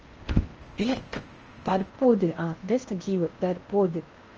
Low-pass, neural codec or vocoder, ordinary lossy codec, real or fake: 7.2 kHz; codec, 16 kHz in and 24 kHz out, 0.8 kbps, FocalCodec, streaming, 65536 codes; Opus, 24 kbps; fake